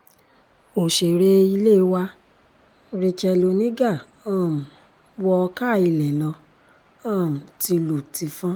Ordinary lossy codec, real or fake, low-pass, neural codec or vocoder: Opus, 32 kbps; real; 19.8 kHz; none